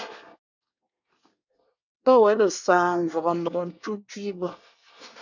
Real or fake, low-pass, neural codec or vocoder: fake; 7.2 kHz; codec, 24 kHz, 1 kbps, SNAC